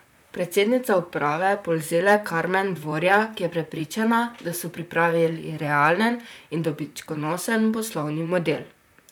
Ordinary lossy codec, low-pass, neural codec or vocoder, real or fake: none; none; vocoder, 44.1 kHz, 128 mel bands, Pupu-Vocoder; fake